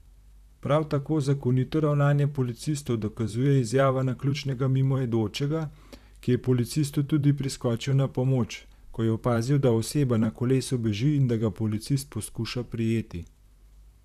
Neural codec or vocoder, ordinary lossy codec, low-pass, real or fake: vocoder, 44.1 kHz, 128 mel bands, Pupu-Vocoder; none; 14.4 kHz; fake